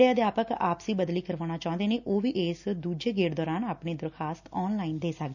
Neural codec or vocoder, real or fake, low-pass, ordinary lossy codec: none; real; 7.2 kHz; none